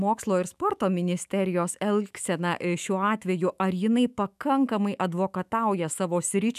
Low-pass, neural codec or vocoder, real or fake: 14.4 kHz; autoencoder, 48 kHz, 128 numbers a frame, DAC-VAE, trained on Japanese speech; fake